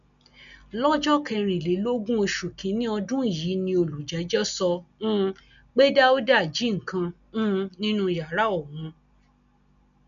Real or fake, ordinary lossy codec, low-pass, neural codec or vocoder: real; none; 7.2 kHz; none